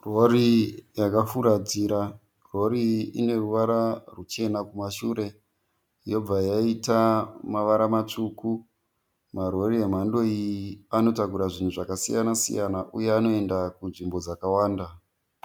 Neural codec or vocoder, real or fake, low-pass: none; real; 19.8 kHz